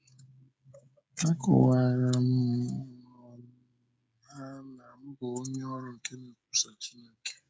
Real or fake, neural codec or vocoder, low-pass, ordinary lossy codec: fake; codec, 16 kHz, 16 kbps, FreqCodec, smaller model; none; none